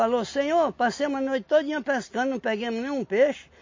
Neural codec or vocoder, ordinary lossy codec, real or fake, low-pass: none; MP3, 32 kbps; real; 7.2 kHz